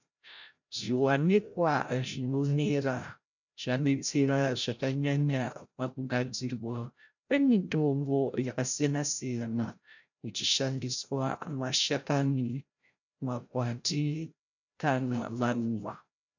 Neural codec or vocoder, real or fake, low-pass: codec, 16 kHz, 0.5 kbps, FreqCodec, larger model; fake; 7.2 kHz